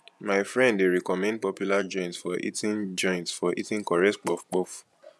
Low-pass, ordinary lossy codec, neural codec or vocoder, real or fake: none; none; vocoder, 24 kHz, 100 mel bands, Vocos; fake